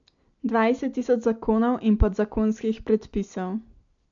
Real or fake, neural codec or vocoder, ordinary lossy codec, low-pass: real; none; AAC, 64 kbps; 7.2 kHz